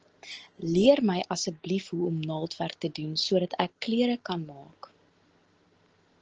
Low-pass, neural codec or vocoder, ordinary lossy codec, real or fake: 7.2 kHz; none; Opus, 16 kbps; real